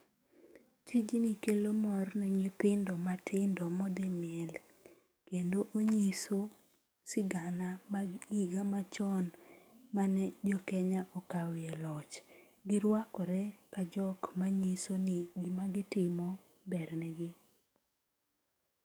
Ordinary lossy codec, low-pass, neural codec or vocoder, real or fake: none; none; codec, 44.1 kHz, 7.8 kbps, DAC; fake